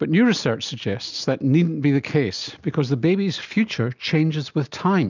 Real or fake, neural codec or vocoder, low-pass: real; none; 7.2 kHz